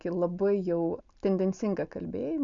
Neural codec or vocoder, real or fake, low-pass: none; real; 7.2 kHz